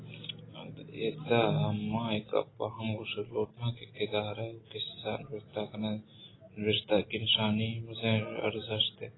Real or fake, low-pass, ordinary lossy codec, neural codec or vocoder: real; 7.2 kHz; AAC, 16 kbps; none